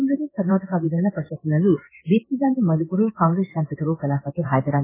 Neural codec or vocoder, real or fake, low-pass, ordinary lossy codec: codec, 16 kHz in and 24 kHz out, 1 kbps, XY-Tokenizer; fake; 3.6 kHz; MP3, 16 kbps